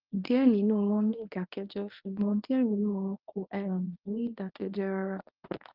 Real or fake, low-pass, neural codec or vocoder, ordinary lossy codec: fake; 5.4 kHz; codec, 24 kHz, 0.9 kbps, WavTokenizer, medium speech release version 1; Opus, 24 kbps